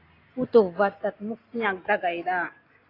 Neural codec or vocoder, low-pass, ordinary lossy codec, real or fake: none; 5.4 kHz; AAC, 24 kbps; real